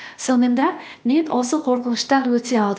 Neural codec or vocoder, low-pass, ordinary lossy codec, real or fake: codec, 16 kHz, 0.8 kbps, ZipCodec; none; none; fake